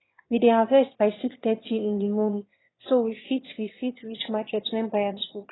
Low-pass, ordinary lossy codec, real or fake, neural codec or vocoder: 7.2 kHz; AAC, 16 kbps; fake; autoencoder, 22.05 kHz, a latent of 192 numbers a frame, VITS, trained on one speaker